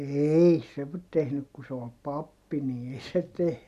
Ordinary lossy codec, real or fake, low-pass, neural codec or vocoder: none; real; 14.4 kHz; none